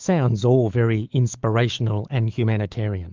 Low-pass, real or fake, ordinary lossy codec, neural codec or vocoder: 7.2 kHz; fake; Opus, 24 kbps; autoencoder, 48 kHz, 128 numbers a frame, DAC-VAE, trained on Japanese speech